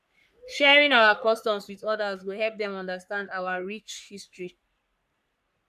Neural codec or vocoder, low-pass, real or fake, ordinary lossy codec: codec, 44.1 kHz, 3.4 kbps, Pupu-Codec; 14.4 kHz; fake; none